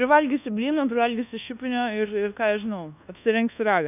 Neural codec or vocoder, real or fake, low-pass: codec, 24 kHz, 1.2 kbps, DualCodec; fake; 3.6 kHz